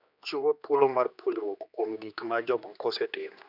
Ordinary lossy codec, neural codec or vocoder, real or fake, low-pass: none; codec, 16 kHz, 2 kbps, X-Codec, HuBERT features, trained on general audio; fake; 5.4 kHz